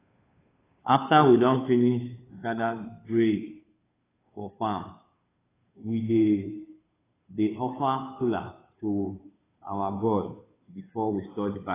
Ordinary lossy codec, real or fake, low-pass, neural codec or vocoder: AAC, 16 kbps; fake; 3.6 kHz; codec, 16 kHz, 2 kbps, FunCodec, trained on Chinese and English, 25 frames a second